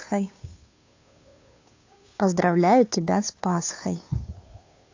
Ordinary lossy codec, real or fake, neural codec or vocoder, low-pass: AAC, 48 kbps; fake; codec, 16 kHz, 2 kbps, FunCodec, trained on Chinese and English, 25 frames a second; 7.2 kHz